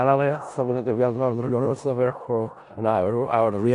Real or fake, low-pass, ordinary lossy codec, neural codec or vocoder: fake; 10.8 kHz; AAC, 64 kbps; codec, 16 kHz in and 24 kHz out, 0.4 kbps, LongCat-Audio-Codec, four codebook decoder